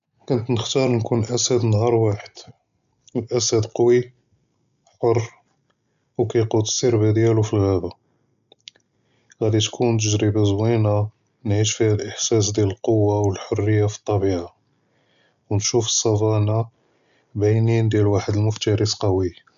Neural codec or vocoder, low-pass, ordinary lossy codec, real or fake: none; 7.2 kHz; none; real